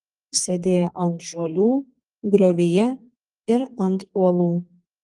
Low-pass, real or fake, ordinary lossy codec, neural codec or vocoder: 10.8 kHz; fake; Opus, 24 kbps; codec, 32 kHz, 1.9 kbps, SNAC